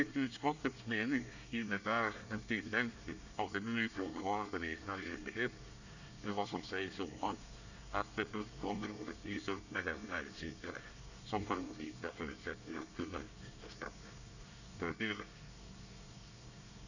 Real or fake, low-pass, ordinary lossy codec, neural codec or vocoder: fake; 7.2 kHz; none; codec, 24 kHz, 1 kbps, SNAC